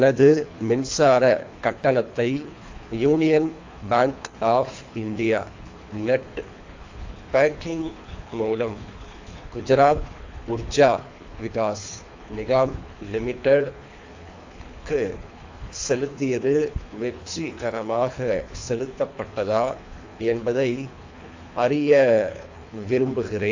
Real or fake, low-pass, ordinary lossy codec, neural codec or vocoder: fake; 7.2 kHz; AAC, 48 kbps; codec, 24 kHz, 3 kbps, HILCodec